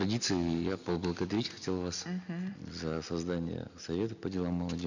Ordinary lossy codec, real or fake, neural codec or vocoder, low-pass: none; real; none; 7.2 kHz